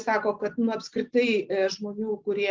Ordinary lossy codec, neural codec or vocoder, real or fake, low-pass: Opus, 32 kbps; none; real; 7.2 kHz